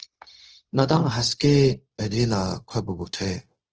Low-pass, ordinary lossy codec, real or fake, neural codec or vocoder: 7.2 kHz; Opus, 16 kbps; fake; codec, 16 kHz, 0.4 kbps, LongCat-Audio-Codec